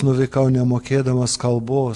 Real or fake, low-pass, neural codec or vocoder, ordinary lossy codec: real; 10.8 kHz; none; AAC, 64 kbps